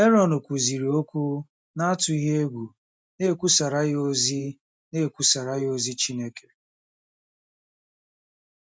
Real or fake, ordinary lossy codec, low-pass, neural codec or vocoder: real; none; none; none